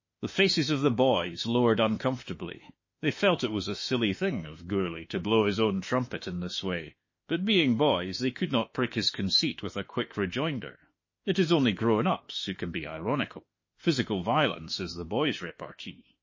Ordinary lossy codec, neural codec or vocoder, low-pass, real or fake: MP3, 32 kbps; autoencoder, 48 kHz, 32 numbers a frame, DAC-VAE, trained on Japanese speech; 7.2 kHz; fake